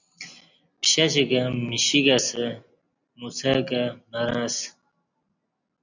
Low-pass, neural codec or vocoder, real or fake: 7.2 kHz; none; real